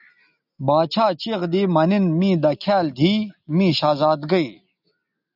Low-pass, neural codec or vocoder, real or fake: 5.4 kHz; none; real